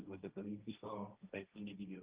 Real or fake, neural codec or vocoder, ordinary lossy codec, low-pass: fake; codec, 16 kHz, 1.1 kbps, Voila-Tokenizer; Opus, 32 kbps; 3.6 kHz